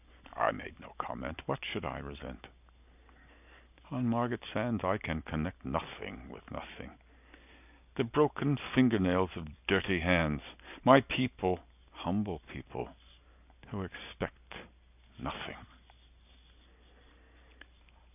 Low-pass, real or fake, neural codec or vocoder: 3.6 kHz; real; none